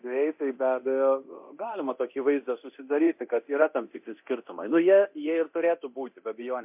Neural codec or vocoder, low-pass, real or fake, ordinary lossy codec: codec, 24 kHz, 0.9 kbps, DualCodec; 3.6 kHz; fake; MP3, 32 kbps